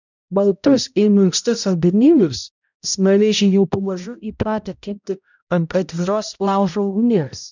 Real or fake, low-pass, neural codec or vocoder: fake; 7.2 kHz; codec, 16 kHz, 0.5 kbps, X-Codec, HuBERT features, trained on balanced general audio